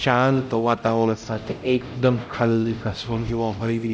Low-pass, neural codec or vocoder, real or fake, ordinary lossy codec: none; codec, 16 kHz, 0.5 kbps, X-Codec, HuBERT features, trained on LibriSpeech; fake; none